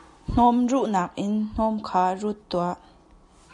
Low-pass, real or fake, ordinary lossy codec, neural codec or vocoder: 10.8 kHz; real; MP3, 96 kbps; none